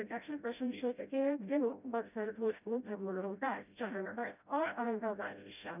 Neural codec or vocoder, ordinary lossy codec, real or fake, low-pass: codec, 16 kHz, 0.5 kbps, FreqCodec, smaller model; none; fake; 3.6 kHz